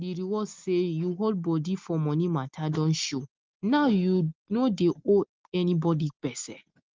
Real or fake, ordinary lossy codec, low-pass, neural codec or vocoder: real; Opus, 32 kbps; 7.2 kHz; none